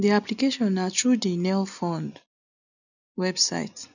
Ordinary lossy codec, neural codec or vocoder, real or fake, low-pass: none; none; real; 7.2 kHz